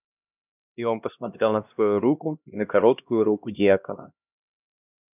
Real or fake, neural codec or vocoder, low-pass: fake; codec, 16 kHz, 1 kbps, X-Codec, HuBERT features, trained on LibriSpeech; 3.6 kHz